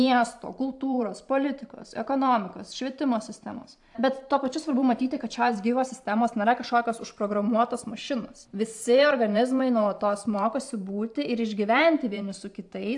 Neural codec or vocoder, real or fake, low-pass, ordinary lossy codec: vocoder, 44.1 kHz, 128 mel bands every 512 samples, BigVGAN v2; fake; 10.8 kHz; AAC, 64 kbps